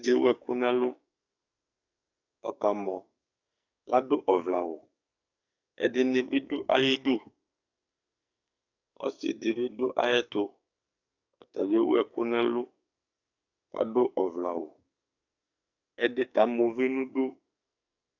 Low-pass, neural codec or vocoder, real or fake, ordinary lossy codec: 7.2 kHz; codec, 44.1 kHz, 2.6 kbps, SNAC; fake; AAC, 48 kbps